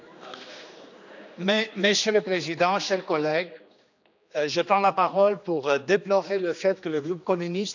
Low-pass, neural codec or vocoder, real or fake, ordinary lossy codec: 7.2 kHz; codec, 16 kHz, 2 kbps, X-Codec, HuBERT features, trained on general audio; fake; none